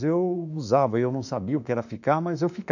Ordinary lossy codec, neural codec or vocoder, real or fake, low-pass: none; autoencoder, 48 kHz, 32 numbers a frame, DAC-VAE, trained on Japanese speech; fake; 7.2 kHz